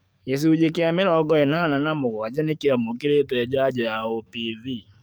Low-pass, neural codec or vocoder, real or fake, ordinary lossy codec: none; codec, 44.1 kHz, 7.8 kbps, DAC; fake; none